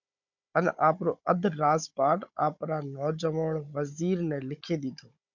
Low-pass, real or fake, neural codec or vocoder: 7.2 kHz; fake; codec, 16 kHz, 16 kbps, FunCodec, trained on Chinese and English, 50 frames a second